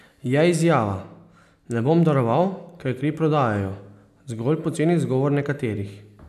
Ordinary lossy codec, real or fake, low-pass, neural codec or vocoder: none; real; 14.4 kHz; none